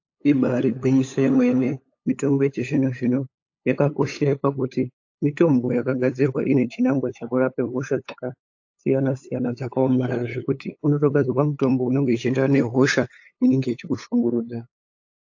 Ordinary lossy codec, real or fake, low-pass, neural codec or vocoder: AAC, 48 kbps; fake; 7.2 kHz; codec, 16 kHz, 8 kbps, FunCodec, trained on LibriTTS, 25 frames a second